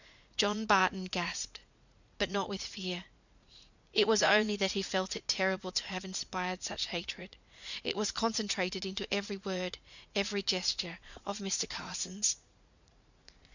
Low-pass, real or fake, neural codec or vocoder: 7.2 kHz; fake; vocoder, 44.1 kHz, 80 mel bands, Vocos